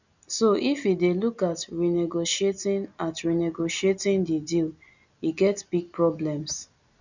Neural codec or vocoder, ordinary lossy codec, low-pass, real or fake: none; none; 7.2 kHz; real